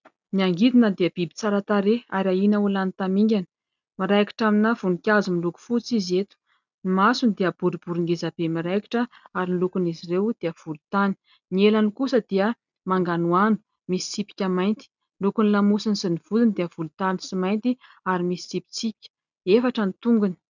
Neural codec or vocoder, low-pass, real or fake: none; 7.2 kHz; real